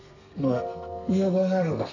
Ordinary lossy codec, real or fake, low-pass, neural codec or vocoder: none; fake; 7.2 kHz; codec, 32 kHz, 1.9 kbps, SNAC